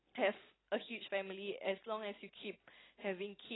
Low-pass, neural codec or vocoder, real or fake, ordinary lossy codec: 7.2 kHz; none; real; AAC, 16 kbps